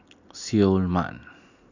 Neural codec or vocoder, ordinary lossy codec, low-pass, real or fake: none; none; 7.2 kHz; real